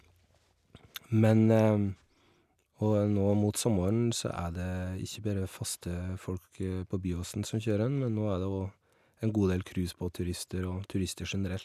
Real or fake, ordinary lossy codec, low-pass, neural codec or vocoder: real; none; 14.4 kHz; none